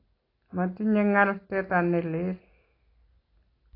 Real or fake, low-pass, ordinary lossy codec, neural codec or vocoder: real; 5.4 kHz; AAC, 24 kbps; none